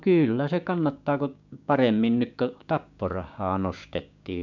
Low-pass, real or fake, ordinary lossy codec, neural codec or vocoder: 7.2 kHz; fake; MP3, 64 kbps; codec, 16 kHz, 6 kbps, DAC